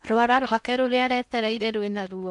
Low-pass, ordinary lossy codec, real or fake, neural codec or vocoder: 10.8 kHz; none; fake; codec, 16 kHz in and 24 kHz out, 0.8 kbps, FocalCodec, streaming, 65536 codes